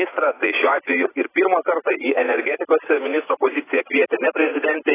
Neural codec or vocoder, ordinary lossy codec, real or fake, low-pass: vocoder, 44.1 kHz, 80 mel bands, Vocos; AAC, 16 kbps; fake; 3.6 kHz